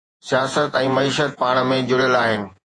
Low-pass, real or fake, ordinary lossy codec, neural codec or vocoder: 10.8 kHz; fake; AAC, 48 kbps; vocoder, 48 kHz, 128 mel bands, Vocos